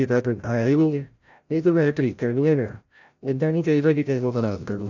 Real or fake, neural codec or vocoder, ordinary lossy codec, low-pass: fake; codec, 16 kHz, 0.5 kbps, FreqCodec, larger model; none; 7.2 kHz